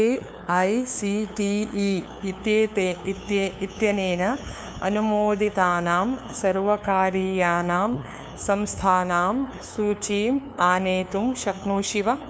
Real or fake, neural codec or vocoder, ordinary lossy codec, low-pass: fake; codec, 16 kHz, 2 kbps, FunCodec, trained on LibriTTS, 25 frames a second; none; none